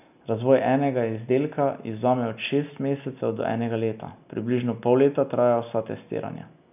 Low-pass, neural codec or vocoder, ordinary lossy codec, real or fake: 3.6 kHz; none; none; real